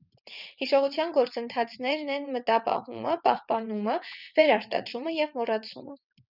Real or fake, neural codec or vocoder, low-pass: fake; vocoder, 22.05 kHz, 80 mel bands, WaveNeXt; 5.4 kHz